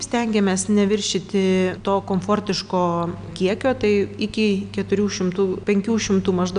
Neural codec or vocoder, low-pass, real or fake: none; 9.9 kHz; real